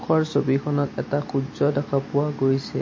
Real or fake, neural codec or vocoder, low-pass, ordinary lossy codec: real; none; 7.2 kHz; MP3, 32 kbps